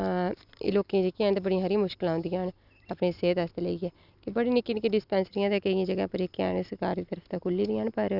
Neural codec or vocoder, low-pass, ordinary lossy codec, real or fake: none; 5.4 kHz; none; real